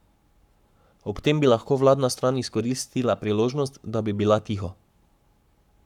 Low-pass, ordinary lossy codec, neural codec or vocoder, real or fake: 19.8 kHz; none; codec, 44.1 kHz, 7.8 kbps, Pupu-Codec; fake